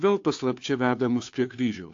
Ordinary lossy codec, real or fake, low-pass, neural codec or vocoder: AAC, 48 kbps; fake; 7.2 kHz; codec, 16 kHz, 2 kbps, FunCodec, trained on Chinese and English, 25 frames a second